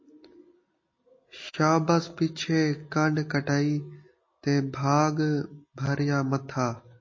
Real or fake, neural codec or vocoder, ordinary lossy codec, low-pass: real; none; MP3, 32 kbps; 7.2 kHz